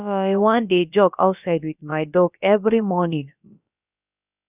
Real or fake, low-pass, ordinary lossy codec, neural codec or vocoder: fake; 3.6 kHz; none; codec, 16 kHz, about 1 kbps, DyCAST, with the encoder's durations